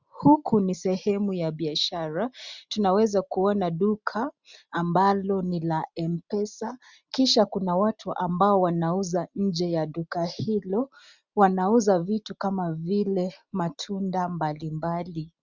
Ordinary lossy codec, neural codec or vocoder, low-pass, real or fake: Opus, 64 kbps; none; 7.2 kHz; real